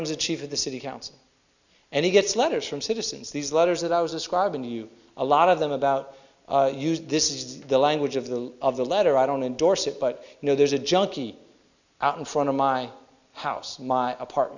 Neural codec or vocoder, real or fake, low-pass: none; real; 7.2 kHz